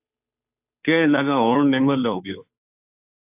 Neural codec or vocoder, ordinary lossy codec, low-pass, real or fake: codec, 16 kHz, 2 kbps, FunCodec, trained on Chinese and English, 25 frames a second; AAC, 32 kbps; 3.6 kHz; fake